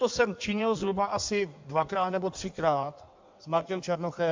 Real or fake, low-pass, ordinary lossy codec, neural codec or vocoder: fake; 7.2 kHz; MP3, 64 kbps; codec, 16 kHz in and 24 kHz out, 1.1 kbps, FireRedTTS-2 codec